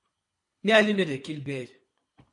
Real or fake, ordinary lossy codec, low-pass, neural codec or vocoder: fake; MP3, 48 kbps; 10.8 kHz; codec, 24 kHz, 3 kbps, HILCodec